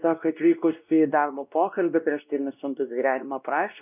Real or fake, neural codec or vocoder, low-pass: fake; codec, 16 kHz, 1 kbps, X-Codec, WavLM features, trained on Multilingual LibriSpeech; 3.6 kHz